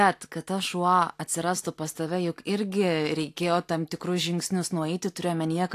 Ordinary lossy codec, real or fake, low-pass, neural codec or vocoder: AAC, 64 kbps; real; 14.4 kHz; none